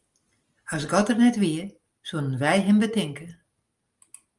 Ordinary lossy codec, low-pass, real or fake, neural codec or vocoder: Opus, 32 kbps; 10.8 kHz; real; none